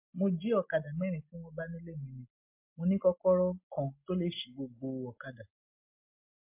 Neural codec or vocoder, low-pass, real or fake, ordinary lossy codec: none; 3.6 kHz; real; MP3, 24 kbps